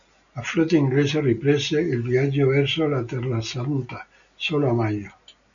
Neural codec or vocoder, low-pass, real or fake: none; 7.2 kHz; real